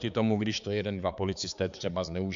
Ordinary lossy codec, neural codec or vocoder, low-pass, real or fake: MP3, 64 kbps; codec, 16 kHz, 4 kbps, X-Codec, HuBERT features, trained on balanced general audio; 7.2 kHz; fake